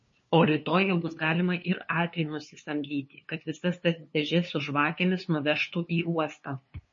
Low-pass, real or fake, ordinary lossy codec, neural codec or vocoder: 7.2 kHz; fake; MP3, 32 kbps; codec, 16 kHz, 2 kbps, FunCodec, trained on LibriTTS, 25 frames a second